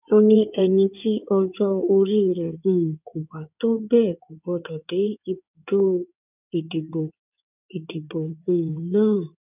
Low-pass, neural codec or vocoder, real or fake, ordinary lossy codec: 3.6 kHz; codec, 16 kHz in and 24 kHz out, 2.2 kbps, FireRedTTS-2 codec; fake; none